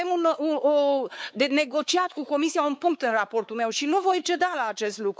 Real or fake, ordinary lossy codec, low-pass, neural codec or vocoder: fake; none; none; codec, 16 kHz, 4 kbps, X-Codec, WavLM features, trained on Multilingual LibriSpeech